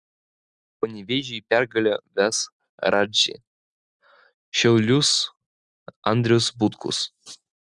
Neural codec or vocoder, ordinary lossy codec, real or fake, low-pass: none; Opus, 64 kbps; real; 10.8 kHz